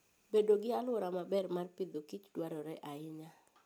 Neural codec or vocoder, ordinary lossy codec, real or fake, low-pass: none; none; real; none